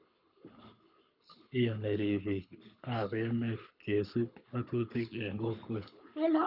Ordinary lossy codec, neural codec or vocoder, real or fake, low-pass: none; codec, 24 kHz, 6 kbps, HILCodec; fake; 5.4 kHz